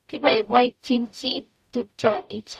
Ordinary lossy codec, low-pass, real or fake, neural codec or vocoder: none; 14.4 kHz; fake; codec, 44.1 kHz, 0.9 kbps, DAC